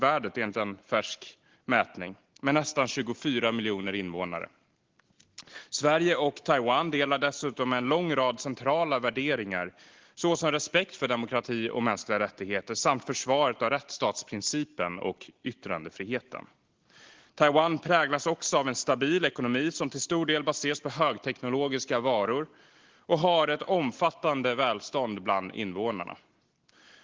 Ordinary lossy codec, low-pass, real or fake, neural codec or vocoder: Opus, 16 kbps; 7.2 kHz; real; none